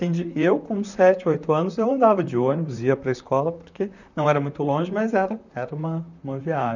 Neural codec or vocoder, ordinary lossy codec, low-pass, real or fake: vocoder, 44.1 kHz, 128 mel bands, Pupu-Vocoder; none; 7.2 kHz; fake